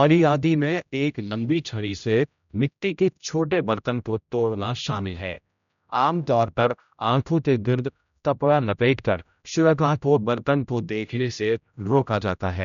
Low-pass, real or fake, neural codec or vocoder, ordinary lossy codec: 7.2 kHz; fake; codec, 16 kHz, 0.5 kbps, X-Codec, HuBERT features, trained on general audio; none